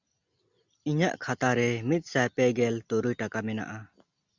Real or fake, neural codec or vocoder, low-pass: real; none; 7.2 kHz